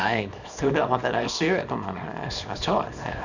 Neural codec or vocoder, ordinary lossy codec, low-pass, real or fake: codec, 24 kHz, 0.9 kbps, WavTokenizer, small release; none; 7.2 kHz; fake